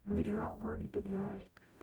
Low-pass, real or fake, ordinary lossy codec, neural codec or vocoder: none; fake; none; codec, 44.1 kHz, 0.9 kbps, DAC